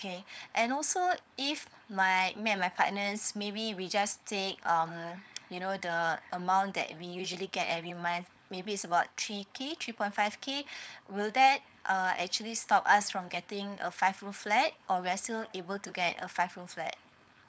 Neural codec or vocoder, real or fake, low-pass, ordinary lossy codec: codec, 16 kHz, 4.8 kbps, FACodec; fake; none; none